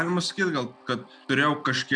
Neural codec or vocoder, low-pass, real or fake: none; 9.9 kHz; real